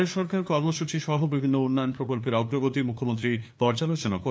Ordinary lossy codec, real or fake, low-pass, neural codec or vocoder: none; fake; none; codec, 16 kHz, 2 kbps, FunCodec, trained on LibriTTS, 25 frames a second